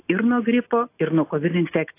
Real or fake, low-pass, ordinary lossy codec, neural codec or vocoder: real; 3.6 kHz; AAC, 24 kbps; none